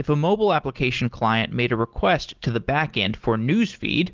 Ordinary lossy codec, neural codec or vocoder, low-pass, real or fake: Opus, 16 kbps; none; 7.2 kHz; real